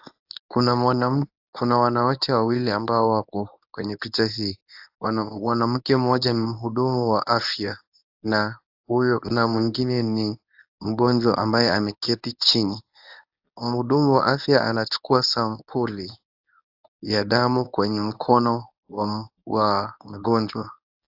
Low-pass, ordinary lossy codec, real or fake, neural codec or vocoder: 5.4 kHz; AAC, 48 kbps; fake; codec, 24 kHz, 0.9 kbps, WavTokenizer, medium speech release version 1